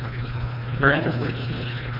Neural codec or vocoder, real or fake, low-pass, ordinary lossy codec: codec, 24 kHz, 1.5 kbps, HILCodec; fake; 5.4 kHz; none